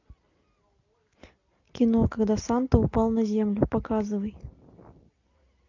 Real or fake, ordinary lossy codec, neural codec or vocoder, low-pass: real; AAC, 48 kbps; none; 7.2 kHz